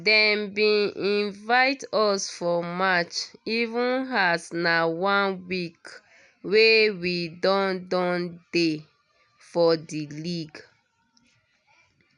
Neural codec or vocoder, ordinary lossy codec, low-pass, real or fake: none; none; 10.8 kHz; real